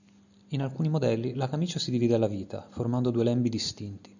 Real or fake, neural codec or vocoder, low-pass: real; none; 7.2 kHz